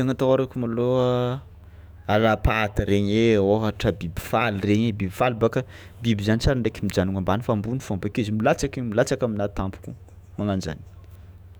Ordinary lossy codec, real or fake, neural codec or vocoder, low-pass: none; fake; autoencoder, 48 kHz, 128 numbers a frame, DAC-VAE, trained on Japanese speech; none